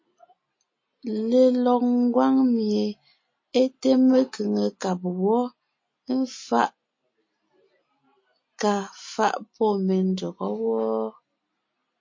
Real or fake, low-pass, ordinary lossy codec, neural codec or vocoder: real; 7.2 kHz; MP3, 32 kbps; none